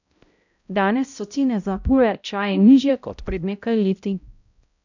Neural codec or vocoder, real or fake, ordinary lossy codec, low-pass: codec, 16 kHz, 0.5 kbps, X-Codec, HuBERT features, trained on balanced general audio; fake; none; 7.2 kHz